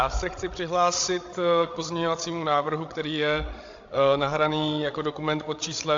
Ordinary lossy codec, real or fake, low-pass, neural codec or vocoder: AAC, 48 kbps; fake; 7.2 kHz; codec, 16 kHz, 16 kbps, FreqCodec, larger model